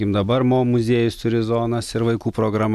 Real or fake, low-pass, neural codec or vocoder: real; 14.4 kHz; none